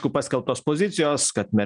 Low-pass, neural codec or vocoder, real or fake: 9.9 kHz; none; real